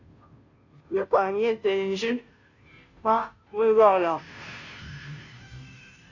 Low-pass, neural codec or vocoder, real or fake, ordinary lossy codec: 7.2 kHz; codec, 16 kHz, 0.5 kbps, FunCodec, trained on Chinese and English, 25 frames a second; fake; AAC, 48 kbps